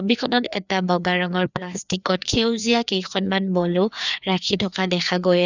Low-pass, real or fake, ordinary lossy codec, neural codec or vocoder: 7.2 kHz; fake; none; codec, 16 kHz, 2 kbps, FreqCodec, larger model